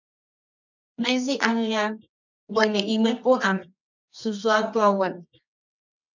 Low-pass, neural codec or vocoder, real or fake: 7.2 kHz; codec, 24 kHz, 0.9 kbps, WavTokenizer, medium music audio release; fake